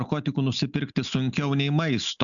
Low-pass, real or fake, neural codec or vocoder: 7.2 kHz; real; none